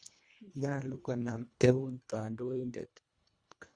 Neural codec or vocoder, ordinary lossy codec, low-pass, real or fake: codec, 24 kHz, 1.5 kbps, HILCodec; Opus, 64 kbps; 9.9 kHz; fake